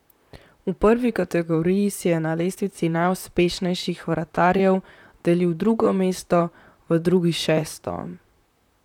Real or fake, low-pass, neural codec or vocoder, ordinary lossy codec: fake; 19.8 kHz; vocoder, 44.1 kHz, 128 mel bands, Pupu-Vocoder; none